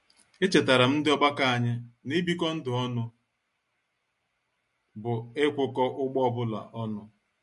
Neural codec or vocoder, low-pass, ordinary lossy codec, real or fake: none; 14.4 kHz; MP3, 48 kbps; real